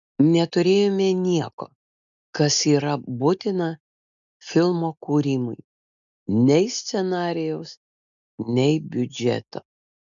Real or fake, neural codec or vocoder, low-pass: real; none; 7.2 kHz